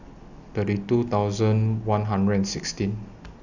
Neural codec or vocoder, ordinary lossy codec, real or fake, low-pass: none; none; real; 7.2 kHz